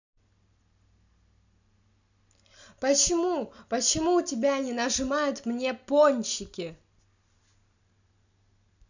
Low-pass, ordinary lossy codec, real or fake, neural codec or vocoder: 7.2 kHz; none; real; none